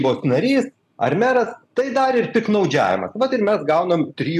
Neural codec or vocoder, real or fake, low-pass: none; real; 14.4 kHz